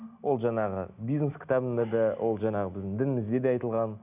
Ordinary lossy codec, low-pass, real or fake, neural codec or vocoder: none; 3.6 kHz; real; none